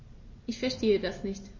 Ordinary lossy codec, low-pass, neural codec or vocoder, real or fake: MP3, 32 kbps; 7.2 kHz; none; real